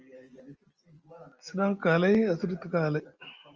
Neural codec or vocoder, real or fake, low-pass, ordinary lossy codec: none; real; 7.2 kHz; Opus, 24 kbps